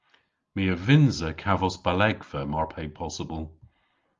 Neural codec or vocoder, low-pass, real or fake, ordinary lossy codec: none; 7.2 kHz; real; Opus, 32 kbps